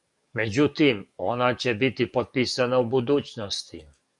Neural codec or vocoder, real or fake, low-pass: codec, 44.1 kHz, 7.8 kbps, DAC; fake; 10.8 kHz